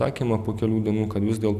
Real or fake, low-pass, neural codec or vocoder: real; 14.4 kHz; none